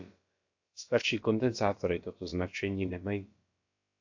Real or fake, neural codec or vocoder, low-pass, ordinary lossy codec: fake; codec, 16 kHz, about 1 kbps, DyCAST, with the encoder's durations; 7.2 kHz; AAC, 48 kbps